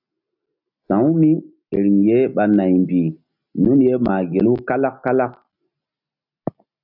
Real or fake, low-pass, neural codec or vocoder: real; 5.4 kHz; none